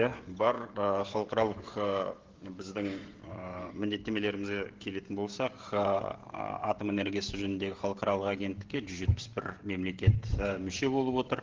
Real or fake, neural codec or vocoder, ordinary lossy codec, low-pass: fake; codec, 16 kHz, 16 kbps, FreqCodec, smaller model; Opus, 16 kbps; 7.2 kHz